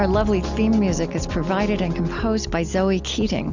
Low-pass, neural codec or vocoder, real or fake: 7.2 kHz; none; real